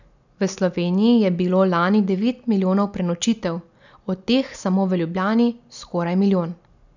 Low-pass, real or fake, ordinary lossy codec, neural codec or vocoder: 7.2 kHz; real; none; none